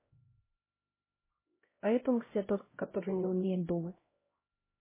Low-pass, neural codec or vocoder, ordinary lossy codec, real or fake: 3.6 kHz; codec, 16 kHz, 0.5 kbps, X-Codec, HuBERT features, trained on LibriSpeech; MP3, 16 kbps; fake